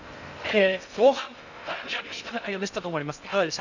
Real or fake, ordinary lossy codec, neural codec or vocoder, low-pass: fake; none; codec, 16 kHz in and 24 kHz out, 0.6 kbps, FocalCodec, streaming, 2048 codes; 7.2 kHz